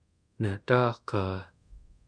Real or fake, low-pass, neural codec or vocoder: fake; 9.9 kHz; codec, 24 kHz, 0.5 kbps, DualCodec